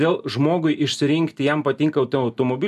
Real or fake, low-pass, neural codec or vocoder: real; 14.4 kHz; none